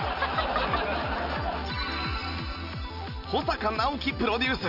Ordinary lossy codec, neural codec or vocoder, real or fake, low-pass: none; none; real; 5.4 kHz